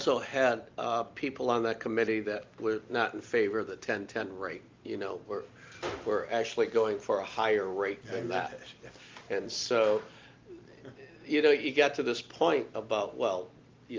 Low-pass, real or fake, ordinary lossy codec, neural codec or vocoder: 7.2 kHz; real; Opus, 32 kbps; none